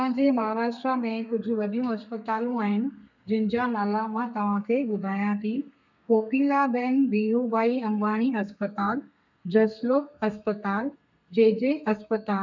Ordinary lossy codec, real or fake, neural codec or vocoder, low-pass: none; fake; codec, 32 kHz, 1.9 kbps, SNAC; 7.2 kHz